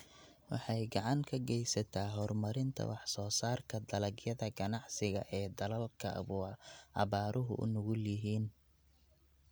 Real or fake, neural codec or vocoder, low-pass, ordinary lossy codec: real; none; none; none